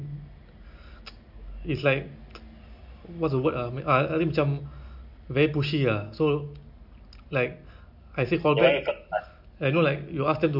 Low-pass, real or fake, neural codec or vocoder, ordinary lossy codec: 5.4 kHz; real; none; none